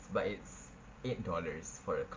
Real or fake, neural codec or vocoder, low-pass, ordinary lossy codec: real; none; 7.2 kHz; Opus, 24 kbps